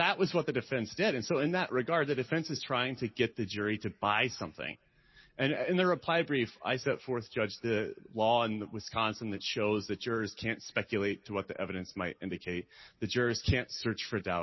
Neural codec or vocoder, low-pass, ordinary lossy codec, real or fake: none; 7.2 kHz; MP3, 24 kbps; real